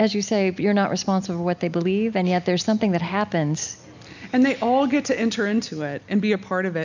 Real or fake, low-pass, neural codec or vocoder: real; 7.2 kHz; none